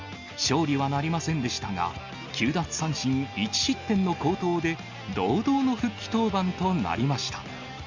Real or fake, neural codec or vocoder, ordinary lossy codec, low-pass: real; none; Opus, 64 kbps; 7.2 kHz